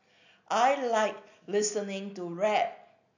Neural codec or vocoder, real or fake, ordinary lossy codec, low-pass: none; real; none; 7.2 kHz